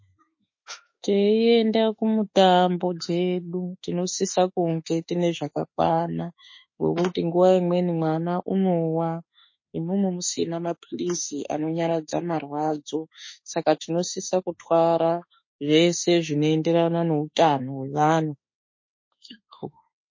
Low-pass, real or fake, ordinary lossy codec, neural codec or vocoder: 7.2 kHz; fake; MP3, 32 kbps; autoencoder, 48 kHz, 32 numbers a frame, DAC-VAE, trained on Japanese speech